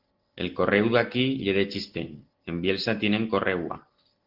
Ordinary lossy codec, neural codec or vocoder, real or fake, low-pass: Opus, 16 kbps; none; real; 5.4 kHz